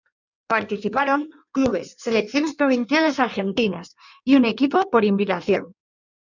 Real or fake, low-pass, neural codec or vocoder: fake; 7.2 kHz; codec, 16 kHz in and 24 kHz out, 1.1 kbps, FireRedTTS-2 codec